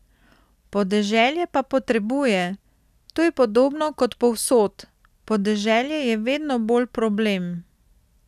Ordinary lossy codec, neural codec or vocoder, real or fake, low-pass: none; none; real; 14.4 kHz